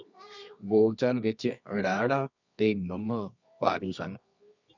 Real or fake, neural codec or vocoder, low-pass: fake; codec, 24 kHz, 0.9 kbps, WavTokenizer, medium music audio release; 7.2 kHz